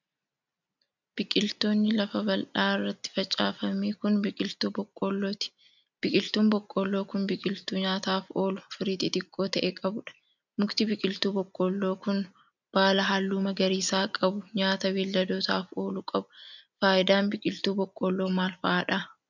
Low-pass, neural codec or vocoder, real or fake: 7.2 kHz; none; real